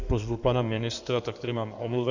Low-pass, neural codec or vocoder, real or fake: 7.2 kHz; codec, 16 kHz in and 24 kHz out, 2.2 kbps, FireRedTTS-2 codec; fake